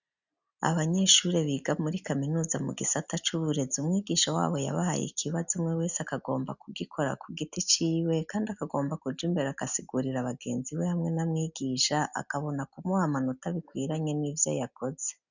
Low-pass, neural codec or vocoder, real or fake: 7.2 kHz; none; real